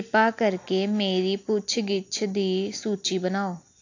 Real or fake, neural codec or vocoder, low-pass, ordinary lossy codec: real; none; 7.2 kHz; AAC, 48 kbps